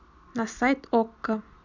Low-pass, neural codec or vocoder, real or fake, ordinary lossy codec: 7.2 kHz; none; real; none